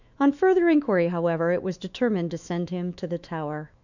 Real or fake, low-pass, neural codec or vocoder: fake; 7.2 kHz; autoencoder, 48 kHz, 128 numbers a frame, DAC-VAE, trained on Japanese speech